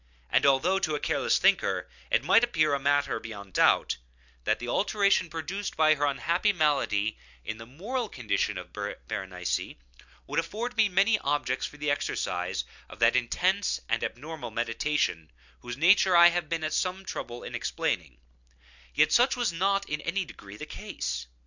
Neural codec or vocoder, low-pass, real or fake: none; 7.2 kHz; real